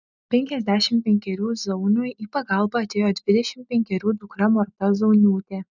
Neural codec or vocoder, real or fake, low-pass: none; real; 7.2 kHz